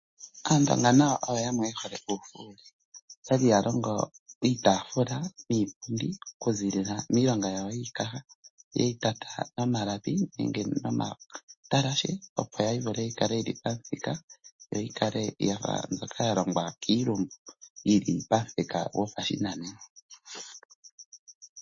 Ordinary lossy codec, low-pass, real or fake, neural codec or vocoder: MP3, 32 kbps; 7.2 kHz; real; none